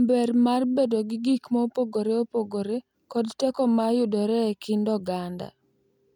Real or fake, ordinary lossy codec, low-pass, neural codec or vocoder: real; none; 19.8 kHz; none